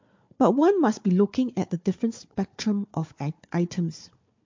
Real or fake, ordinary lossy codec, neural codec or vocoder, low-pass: fake; MP3, 48 kbps; codec, 16 kHz, 4 kbps, FunCodec, trained on Chinese and English, 50 frames a second; 7.2 kHz